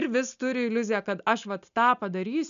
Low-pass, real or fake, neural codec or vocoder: 7.2 kHz; real; none